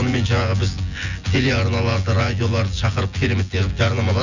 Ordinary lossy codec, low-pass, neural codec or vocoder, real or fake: none; 7.2 kHz; vocoder, 24 kHz, 100 mel bands, Vocos; fake